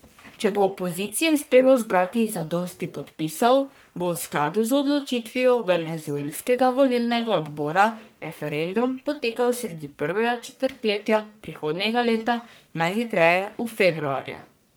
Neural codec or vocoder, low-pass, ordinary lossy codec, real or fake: codec, 44.1 kHz, 1.7 kbps, Pupu-Codec; none; none; fake